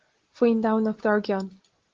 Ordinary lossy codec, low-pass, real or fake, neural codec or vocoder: Opus, 16 kbps; 7.2 kHz; real; none